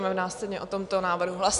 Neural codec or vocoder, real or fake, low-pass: none; real; 10.8 kHz